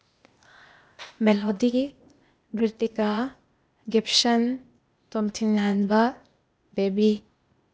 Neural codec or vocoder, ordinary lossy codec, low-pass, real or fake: codec, 16 kHz, 0.8 kbps, ZipCodec; none; none; fake